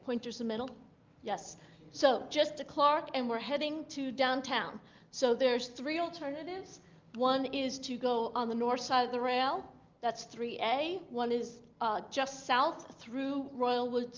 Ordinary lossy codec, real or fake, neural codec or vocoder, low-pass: Opus, 24 kbps; real; none; 7.2 kHz